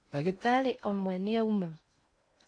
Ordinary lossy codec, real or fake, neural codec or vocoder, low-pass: AAC, 48 kbps; fake; codec, 16 kHz in and 24 kHz out, 0.6 kbps, FocalCodec, streaming, 2048 codes; 9.9 kHz